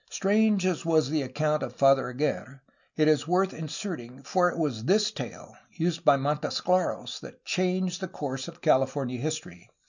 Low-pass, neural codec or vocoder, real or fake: 7.2 kHz; none; real